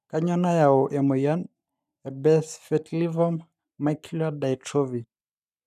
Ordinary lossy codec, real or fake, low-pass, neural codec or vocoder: none; fake; 14.4 kHz; codec, 44.1 kHz, 7.8 kbps, Pupu-Codec